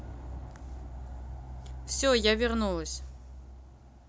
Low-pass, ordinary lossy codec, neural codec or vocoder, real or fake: none; none; none; real